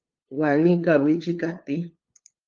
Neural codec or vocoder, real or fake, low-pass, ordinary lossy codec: codec, 16 kHz, 2 kbps, FunCodec, trained on LibriTTS, 25 frames a second; fake; 7.2 kHz; Opus, 32 kbps